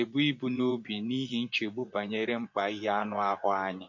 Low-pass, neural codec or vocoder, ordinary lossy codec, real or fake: 7.2 kHz; vocoder, 24 kHz, 100 mel bands, Vocos; MP3, 48 kbps; fake